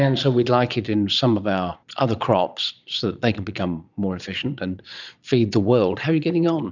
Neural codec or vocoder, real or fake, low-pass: vocoder, 22.05 kHz, 80 mel bands, Vocos; fake; 7.2 kHz